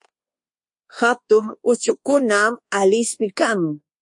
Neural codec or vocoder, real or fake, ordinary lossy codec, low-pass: codec, 24 kHz, 1.2 kbps, DualCodec; fake; MP3, 48 kbps; 10.8 kHz